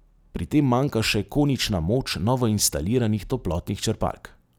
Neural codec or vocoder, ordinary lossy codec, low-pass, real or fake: none; none; none; real